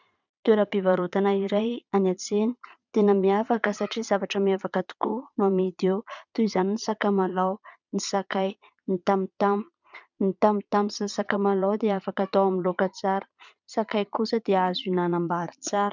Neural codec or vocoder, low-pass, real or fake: vocoder, 22.05 kHz, 80 mel bands, Vocos; 7.2 kHz; fake